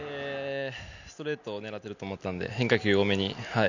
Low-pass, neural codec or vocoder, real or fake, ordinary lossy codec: 7.2 kHz; none; real; none